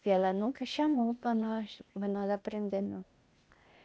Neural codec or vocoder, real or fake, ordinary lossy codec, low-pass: codec, 16 kHz, 0.8 kbps, ZipCodec; fake; none; none